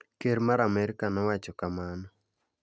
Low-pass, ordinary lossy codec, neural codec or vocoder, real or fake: none; none; none; real